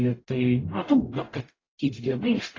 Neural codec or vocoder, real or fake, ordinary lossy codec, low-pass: codec, 44.1 kHz, 0.9 kbps, DAC; fake; AAC, 32 kbps; 7.2 kHz